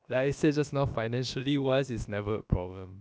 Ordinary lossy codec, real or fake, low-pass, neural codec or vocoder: none; fake; none; codec, 16 kHz, about 1 kbps, DyCAST, with the encoder's durations